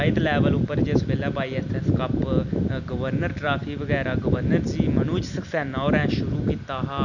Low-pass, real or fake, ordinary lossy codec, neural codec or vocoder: 7.2 kHz; real; none; none